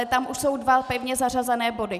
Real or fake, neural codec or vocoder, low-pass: real; none; 14.4 kHz